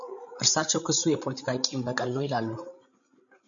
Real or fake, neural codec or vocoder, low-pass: fake; codec, 16 kHz, 16 kbps, FreqCodec, larger model; 7.2 kHz